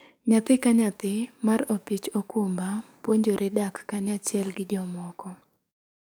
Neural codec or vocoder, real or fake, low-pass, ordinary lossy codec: codec, 44.1 kHz, 7.8 kbps, DAC; fake; none; none